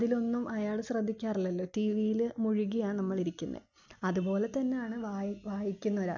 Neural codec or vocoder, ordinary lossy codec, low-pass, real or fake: none; none; 7.2 kHz; real